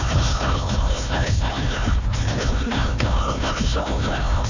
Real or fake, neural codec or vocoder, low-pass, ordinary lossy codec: fake; codec, 16 kHz, 1 kbps, FunCodec, trained on Chinese and English, 50 frames a second; 7.2 kHz; none